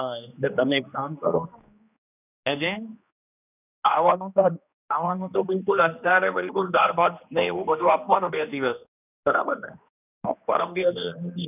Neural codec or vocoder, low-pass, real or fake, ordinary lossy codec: codec, 16 kHz, 2 kbps, X-Codec, HuBERT features, trained on general audio; 3.6 kHz; fake; none